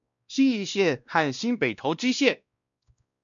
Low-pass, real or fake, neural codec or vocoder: 7.2 kHz; fake; codec, 16 kHz, 1 kbps, X-Codec, WavLM features, trained on Multilingual LibriSpeech